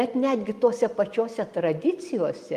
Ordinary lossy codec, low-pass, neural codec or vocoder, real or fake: Opus, 32 kbps; 14.4 kHz; none; real